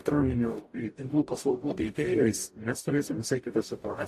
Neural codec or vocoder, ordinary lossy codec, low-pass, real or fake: codec, 44.1 kHz, 0.9 kbps, DAC; AAC, 64 kbps; 14.4 kHz; fake